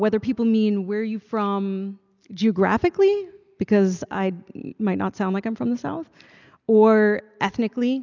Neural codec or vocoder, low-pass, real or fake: none; 7.2 kHz; real